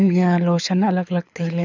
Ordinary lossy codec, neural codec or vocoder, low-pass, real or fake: none; codec, 24 kHz, 6 kbps, HILCodec; 7.2 kHz; fake